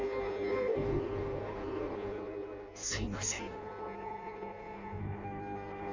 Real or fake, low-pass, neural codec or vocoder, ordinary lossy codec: fake; 7.2 kHz; codec, 16 kHz in and 24 kHz out, 0.6 kbps, FireRedTTS-2 codec; none